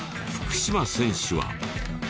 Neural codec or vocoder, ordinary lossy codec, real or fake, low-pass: none; none; real; none